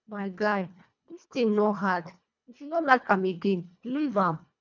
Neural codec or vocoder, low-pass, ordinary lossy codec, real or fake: codec, 24 kHz, 1.5 kbps, HILCodec; 7.2 kHz; none; fake